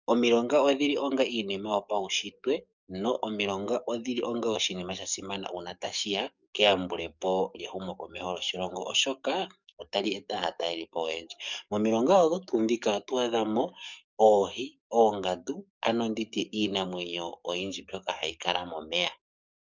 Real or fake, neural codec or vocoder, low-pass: fake; codec, 44.1 kHz, 7.8 kbps, DAC; 7.2 kHz